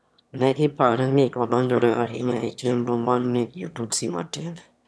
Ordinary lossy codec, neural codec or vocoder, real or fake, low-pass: none; autoencoder, 22.05 kHz, a latent of 192 numbers a frame, VITS, trained on one speaker; fake; none